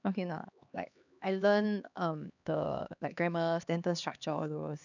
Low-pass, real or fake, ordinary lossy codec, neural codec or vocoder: 7.2 kHz; fake; none; codec, 16 kHz, 2 kbps, X-Codec, HuBERT features, trained on LibriSpeech